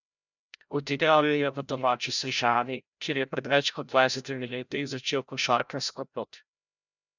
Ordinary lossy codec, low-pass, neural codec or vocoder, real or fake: none; 7.2 kHz; codec, 16 kHz, 0.5 kbps, FreqCodec, larger model; fake